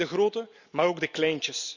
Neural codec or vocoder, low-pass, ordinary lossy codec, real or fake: none; 7.2 kHz; none; real